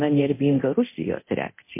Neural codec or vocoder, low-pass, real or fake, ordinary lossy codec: codec, 24 kHz, 0.9 kbps, DualCodec; 3.6 kHz; fake; MP3, 24 kbps